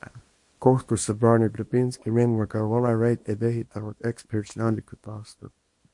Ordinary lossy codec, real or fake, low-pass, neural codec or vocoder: MP3, 48 kbps; fake; 10.8 kHz; codec, 24 kHz, 0.9 kbps, WavTokenizer, small release